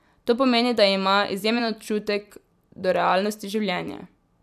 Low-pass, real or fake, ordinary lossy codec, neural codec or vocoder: 14.4 kHz; fake; none; vocoder, 44.1 kHz, 128 mel bands every 256 samples, BigVGAN v2